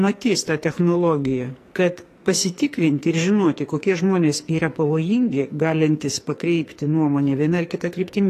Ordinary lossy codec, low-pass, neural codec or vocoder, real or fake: AAC, 48 kbps; 14.4 kHz; codec, 44.1 kHz, 2.6 kbps, SNAC; fake